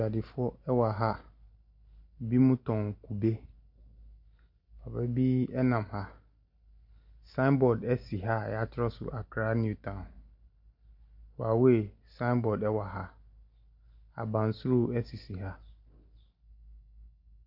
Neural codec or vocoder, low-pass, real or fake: none; 5.4 kHz; real